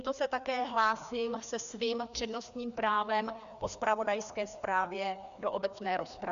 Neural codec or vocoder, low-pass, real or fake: codec, 16 kHz, 2 kbps, FreqCodec, larger model; 7.2 kHz; fake